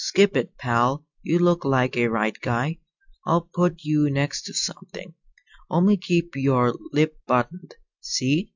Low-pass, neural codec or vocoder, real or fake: 7.2 kHz; none; real